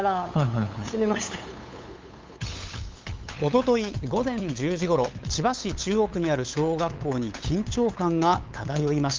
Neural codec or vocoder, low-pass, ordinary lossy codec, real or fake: codec, 16 kHz, 8 kbps, FunCodec, trained on LibriTTS, 25 frames a second; 7.2 kHz; Opus, 32 kbps; fake